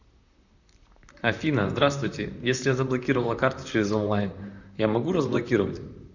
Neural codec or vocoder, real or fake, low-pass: vocoder, 44.1 kHz, 128 mel bands, Pupu-Vocoder; fake; 7.2 kHz